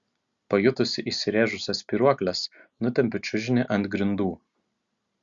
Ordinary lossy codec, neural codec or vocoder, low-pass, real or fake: Opus, 64 kbps; none; 7.2 kHz; real